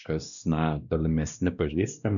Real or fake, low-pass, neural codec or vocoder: fake; 7.2 kHz; codec, 16 kHz, 1 kbps, X-Codec, WavLM features, trained on Multilingual LibriSpeech